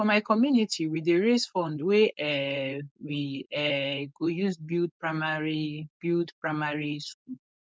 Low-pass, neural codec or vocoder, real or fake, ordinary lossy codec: none; codec, 16 kHz, 4.8 kbps, FACodec; fake; none